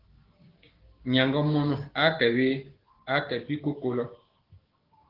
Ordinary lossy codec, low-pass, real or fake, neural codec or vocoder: Opus, 16 kbps; 5.4 kHz; fake; codec, 16 kHz, 6 kbps, DAC